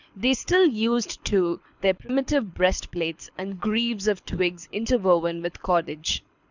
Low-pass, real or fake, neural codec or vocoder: 7.2 kHz; fake; codec, 24 kHz, 6 kbps, HILCodec